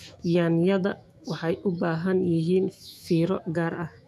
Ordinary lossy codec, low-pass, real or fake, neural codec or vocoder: none; 14.4 kHz; fake; autoencoder, 48 kHz, 128 numbers a frame, DAC-VAE, trained on Japanese speech